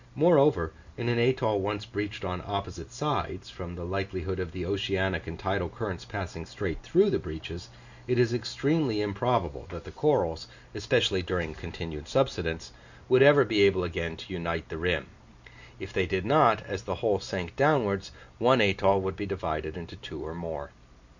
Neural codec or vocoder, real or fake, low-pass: none; real; 7.2 kHz